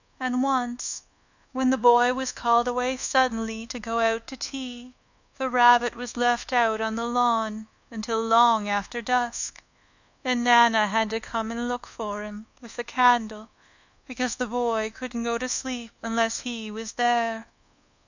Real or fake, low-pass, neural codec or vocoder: fake; 7.2 kHz; codec, 24 kHz, 1.2 kbps, DualCodec